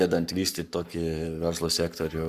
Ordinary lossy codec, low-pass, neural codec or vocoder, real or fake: Opus, 64 kbps; 14.4 kHz; codec, 44.1 kHz, 7.8 kbps, Pupu-Codec; fake